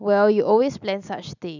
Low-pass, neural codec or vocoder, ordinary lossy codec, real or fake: 7.2 kHz; none; none; real